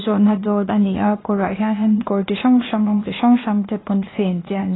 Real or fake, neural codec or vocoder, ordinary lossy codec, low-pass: fake; codec, 16 kHz, 0.8 kbps, ZipCodec; AAC, 16 kbps; 7.2 kHz